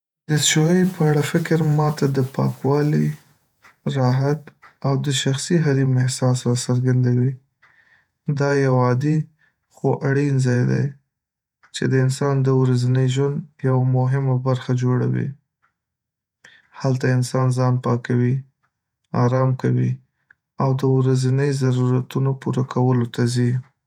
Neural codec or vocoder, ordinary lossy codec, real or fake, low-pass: vocoder, 48 kHz, 128 mel bands, Vocos; none; fake; 19.8 kHz